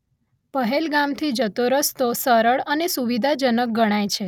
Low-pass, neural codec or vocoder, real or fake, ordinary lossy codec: 19.8 kHz; none; real; none